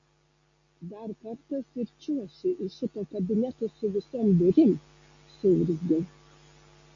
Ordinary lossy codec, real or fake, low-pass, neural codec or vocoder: MP3, 48 kbps; real; 7.2 kHz; none